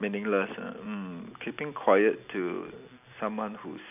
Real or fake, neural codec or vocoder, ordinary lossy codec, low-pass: real; none; none; 3.6 kHz